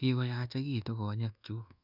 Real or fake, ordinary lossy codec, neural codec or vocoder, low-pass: fake; none; codec, 24 kHz, 1.2 kbps, DualCodec; 5.4 kHz